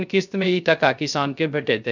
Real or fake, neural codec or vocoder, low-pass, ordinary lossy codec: fake; codec, 16 kHz, 0.3 kbps, FocalCodec; 7.2 kHz; none